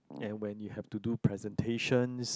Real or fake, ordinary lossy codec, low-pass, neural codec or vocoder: real; none; none; none